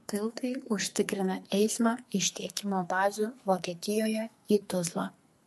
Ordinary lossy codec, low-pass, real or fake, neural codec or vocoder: MP3, 64 kbps; 14.4 kHz; fake; codec, 44.1 kHz, 2.6 kbps, SNAC